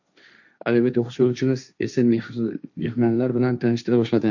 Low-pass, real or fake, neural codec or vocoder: 7.2 kHz; fake; codec, 16 kHz, 1.1 kbps, Voila-Tokenizer